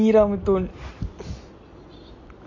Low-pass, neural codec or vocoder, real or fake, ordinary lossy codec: 7.2 kHz; none; real; MP3, 32 kbps